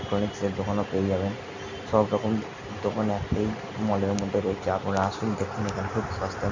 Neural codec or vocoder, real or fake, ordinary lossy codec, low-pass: none; real; MP3, 64 kbps; 7.2 kHz